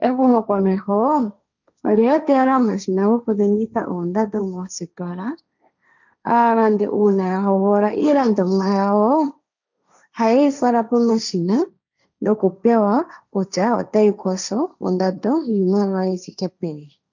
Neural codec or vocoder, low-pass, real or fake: codec, 16 kHz, 1.1 kbps, Voila-Tokenizer; 7.2 kHz; fake